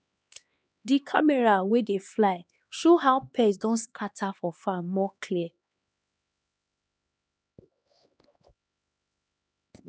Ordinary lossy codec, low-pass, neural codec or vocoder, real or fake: none; none; codec, 16 kHz, 2 kbps, X-Codec, HuBERT features, trained on LibriSpeech; fake